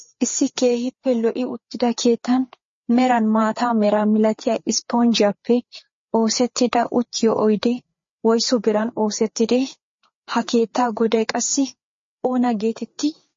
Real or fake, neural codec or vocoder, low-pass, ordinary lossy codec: fake; codec, 16 kHz, 4 kbps, FreqCodec, larger model; 7.2 kHz; MP3, 32 kbps